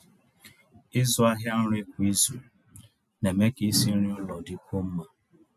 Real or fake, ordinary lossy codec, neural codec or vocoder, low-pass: real; none; none; 14.4 kHz